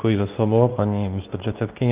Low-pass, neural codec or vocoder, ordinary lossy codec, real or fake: 3.6 kHz; codec, 24 kHz, 0.9 kbps, WavTokenizer, medium speech release version 2; Opus, 24 kbps; fake